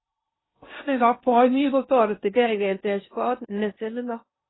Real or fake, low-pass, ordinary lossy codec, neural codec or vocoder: fake; 7.2 kHz; AAC, 16 kbps; codec, 16 kHz in and 24 kHz out, 0.6 kbps, FocalCodec, streaming, 2048 codes